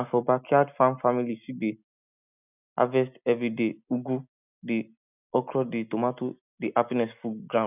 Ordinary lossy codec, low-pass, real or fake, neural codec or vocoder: none; 3.6 kHz; real; none